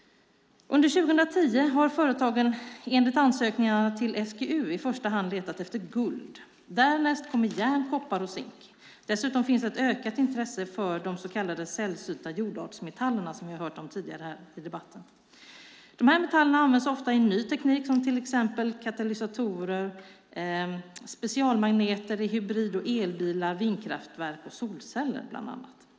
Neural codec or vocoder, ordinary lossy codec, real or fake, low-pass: none; none; real; none